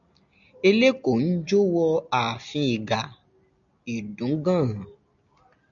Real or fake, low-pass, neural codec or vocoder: real; 7.2 kHz; none